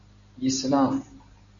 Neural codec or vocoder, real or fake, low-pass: none; real; 7.2 kHz